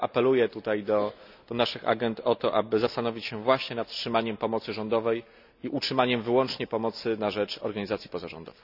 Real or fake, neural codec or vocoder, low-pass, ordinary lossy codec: real; none; 5.4 kHz; none